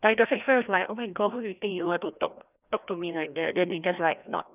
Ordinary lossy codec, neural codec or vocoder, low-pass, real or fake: none; codec, 16 kHz, 1 kbps, FreqCodec, larger model; 3.6 kHz; fake